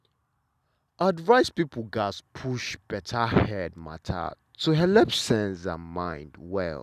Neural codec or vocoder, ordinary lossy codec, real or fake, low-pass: none; none; real; 14.4 kHz